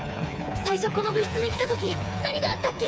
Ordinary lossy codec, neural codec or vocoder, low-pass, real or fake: none; codec, 16 kHz, 4 kbps, FreqCodec, smaller model; none; fake